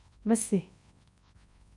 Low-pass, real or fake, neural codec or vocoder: 10.8 kHz; fake; codec, 24 kHz, 0.9 kbps, WavTokenizer, large speech release